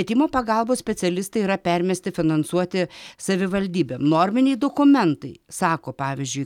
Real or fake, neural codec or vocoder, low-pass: real; none; 19.8 kHz